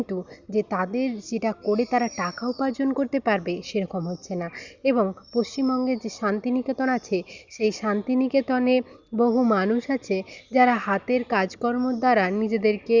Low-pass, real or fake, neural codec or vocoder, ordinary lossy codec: 7.2 kHz; real; none; Opus, 64 kbps